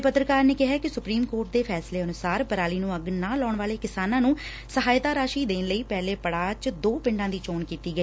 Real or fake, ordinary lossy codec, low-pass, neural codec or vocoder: real; none; none; none